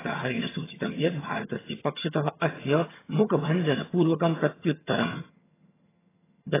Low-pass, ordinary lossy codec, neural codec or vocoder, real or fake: 3.6 kHz; AAC, 16 kbps; vocoder, 22.05 kHz, 80 mel bands, HiFi-GAN; fake